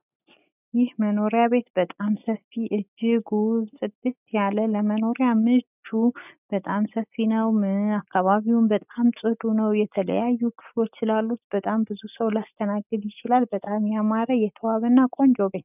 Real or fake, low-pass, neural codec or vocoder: real; 3.6 kHz; none